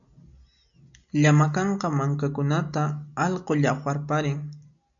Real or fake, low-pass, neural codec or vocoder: real; 7.2 kHz; none